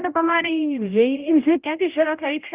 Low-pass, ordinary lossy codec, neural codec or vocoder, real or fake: 3.6 kHz; Opus, 24 kbps; codec, 16 kHz, 0.5 kbps, X-Codec, HuBERT features, trained on general audio; fake